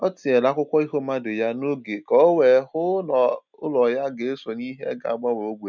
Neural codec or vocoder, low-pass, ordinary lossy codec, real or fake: none; 7.2 kHz; none; real